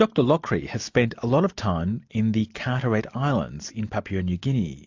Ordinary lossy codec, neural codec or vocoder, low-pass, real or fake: AAC, 48 kbps; none; 7.2 kHz; real